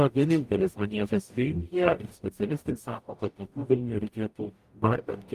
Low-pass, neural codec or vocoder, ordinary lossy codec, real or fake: 14.4 kHz; codec, 44.1 kHz, 0.9 kbps, DAC; Opus, 32 kbps; fake